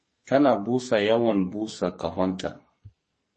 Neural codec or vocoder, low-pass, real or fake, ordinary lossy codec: codec, 44.1 kHz, 2.6 kbps, SNAC; 10.8 kHz; fake; MP3, 32 kbps